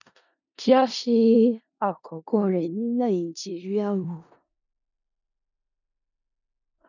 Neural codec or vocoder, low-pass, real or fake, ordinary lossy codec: codec, 16 kHz in and 24 kHz out, 0.4 kbps, LongCat-Audio-Codec, four codebook decoder; 7.2 kHz; fake; none